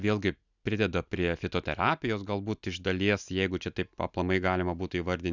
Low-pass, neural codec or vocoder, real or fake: 7.2 kHz; none; real